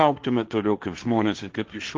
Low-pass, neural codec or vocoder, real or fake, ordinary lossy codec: 7.2 kHz; codec, 16 kHz, 1.1 kbps, Voila-Tokenizer; fake; Opus, 32 kbps